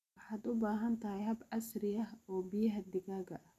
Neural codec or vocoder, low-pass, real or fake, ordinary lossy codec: none; 14.4 kHz; real; none